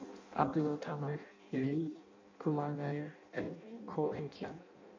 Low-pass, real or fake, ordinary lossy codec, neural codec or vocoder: 7.2 kHz; fake; MP3, 48 kbps; codec, 16 kHz in and 24 kHz out, 0.6 kbps, FireRedTTS-2 codec